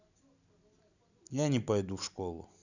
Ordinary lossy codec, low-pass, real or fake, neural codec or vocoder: none; 7.2 kHz; real; none